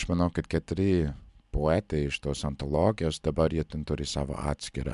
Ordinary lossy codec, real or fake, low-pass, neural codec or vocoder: MP3, 96 kbps; real; 10.8 kHz; none